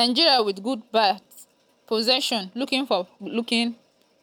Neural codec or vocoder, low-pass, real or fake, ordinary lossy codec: none; none; real; none